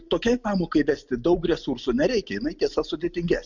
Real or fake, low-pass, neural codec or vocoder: real; 7.2 kHz; none